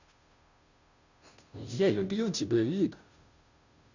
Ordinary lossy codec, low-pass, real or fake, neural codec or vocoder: none; 7.2 kHz; fake; codec, 16 kHz, 0.5 kbps, FunCodec, trained on Chinese and English, 25 frames a second